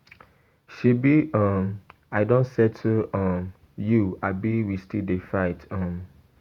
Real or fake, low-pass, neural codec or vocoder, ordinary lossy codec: fake; 19.8 kHz; vocoder, 48 kHz, 128 mel bands, Vocos; Opus, 64 kbps